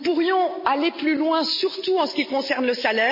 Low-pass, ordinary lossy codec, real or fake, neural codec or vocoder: 5.4 kHz; MP3, 24 kbps; real; none